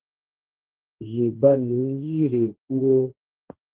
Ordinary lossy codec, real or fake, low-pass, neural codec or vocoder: Opus, 16 kbps; fake; 3.6 kHz; codec, 16 kHz, 1.1 kbps, Voila-Tokenizer